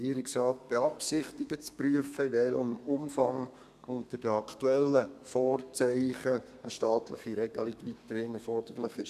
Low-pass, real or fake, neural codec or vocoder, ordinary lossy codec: 14.4 kHz; fake; codec, 32 kHz, 1.9 kbps, SNAC; none